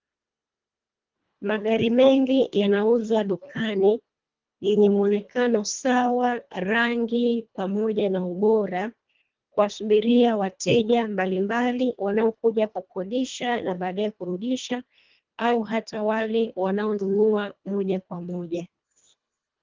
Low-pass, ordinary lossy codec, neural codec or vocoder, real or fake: 7.2 kHz; Opus, 32 kbps; codec, 24 kHz, 1.5 kbps, HILCodec; fake